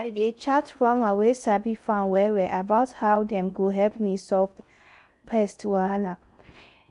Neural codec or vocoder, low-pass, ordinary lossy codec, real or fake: codec, 16 kHz in and 24 kHz out, 0.6 kbps, FocalCodec, streaming, 4096 codes; 10.8 kHz; none; fake